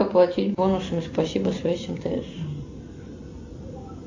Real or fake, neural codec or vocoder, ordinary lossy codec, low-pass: real; none; AAC, 48 kbps; 7.2 kHz